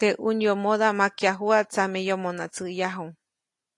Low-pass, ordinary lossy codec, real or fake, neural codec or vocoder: 10.8 kHz; AAC, 48 kbps; real; none